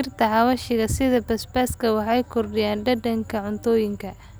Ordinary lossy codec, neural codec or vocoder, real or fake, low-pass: none; none; real; none